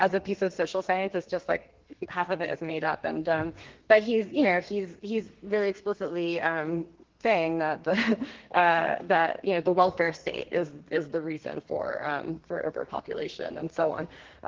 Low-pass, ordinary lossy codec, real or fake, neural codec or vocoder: 7.2 kHz; Opus, 16 kbps; fake; codec, 32 kHz, 1.9 kbps, SNAC